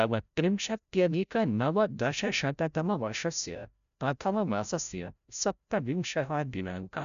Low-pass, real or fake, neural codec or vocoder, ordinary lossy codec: 7.2 kHz; fake; codec, 16 kHz, 0.5 kbps, FreqCodec, larger model; none